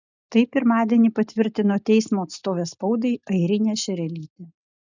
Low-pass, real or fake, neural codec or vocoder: 7.2 kHz; real; none